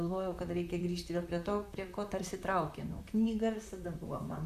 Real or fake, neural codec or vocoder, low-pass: fake; vocoder, 44.1 kHz, 128 mel bands, Pupu-Vocoder; 14.4 kHz